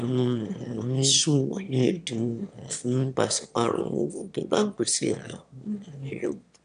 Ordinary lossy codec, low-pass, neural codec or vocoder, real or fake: none; 9.9 kHz; autoencoder, 22.05 kHz, a latent of 192 numbers a frame, VITS, trained on one speaker; fake